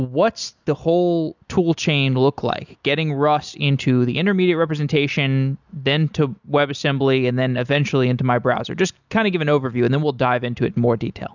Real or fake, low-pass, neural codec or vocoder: real; 7.2 kHz; none